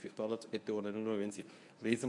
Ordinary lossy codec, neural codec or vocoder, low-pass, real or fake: MP3, 64 kbps; codec, 24 kHz, 0.9 kbps, WavTokenizer, medium speech release version 1; 10.8 kHz; fake